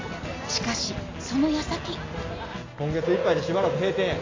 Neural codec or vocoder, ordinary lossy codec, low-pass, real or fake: none; AAC, 32 kbps; 7.2 kHz; real